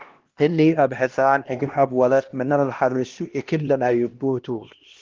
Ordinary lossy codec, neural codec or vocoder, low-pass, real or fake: Opus, 16 kbps; codec, 16 kHz, 1 kbps, X-Codec, HuBERT features, trained on LibriSpeech; 7.2 kHz; fake